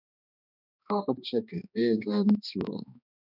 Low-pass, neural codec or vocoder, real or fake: 5.4 kHz; codec, 16 kHz, 2 kbps, X-Codec, HuBERT features, trained on balanced general audio; fake